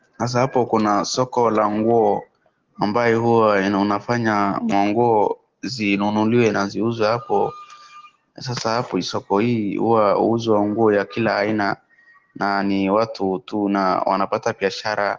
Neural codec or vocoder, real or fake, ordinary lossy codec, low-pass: none; real; Opus, 16 kbps; 7.2 kHz